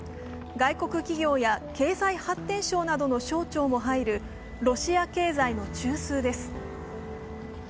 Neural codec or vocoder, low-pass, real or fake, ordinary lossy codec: none; none; real; none